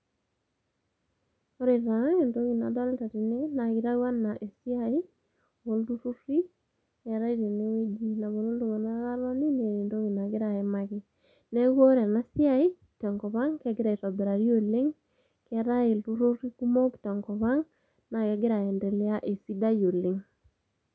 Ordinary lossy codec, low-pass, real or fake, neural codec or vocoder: none; none; real; none